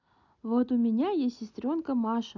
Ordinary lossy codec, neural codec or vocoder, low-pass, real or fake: none; none; 7.2 kHz; real